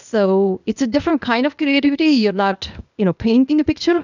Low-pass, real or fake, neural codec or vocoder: 7.2 kHz; fake; codec, 16 kHz, 0.8 kbps, ZipCodec